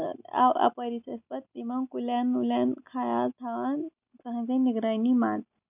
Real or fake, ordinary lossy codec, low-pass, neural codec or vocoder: real; none; 3.6 kHz; none